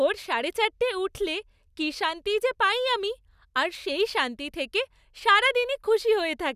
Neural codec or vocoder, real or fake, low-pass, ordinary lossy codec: none; real; 14.4 kHz; none